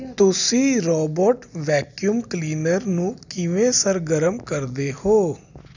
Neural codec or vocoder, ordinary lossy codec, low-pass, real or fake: none; none; 7.2 kHz; real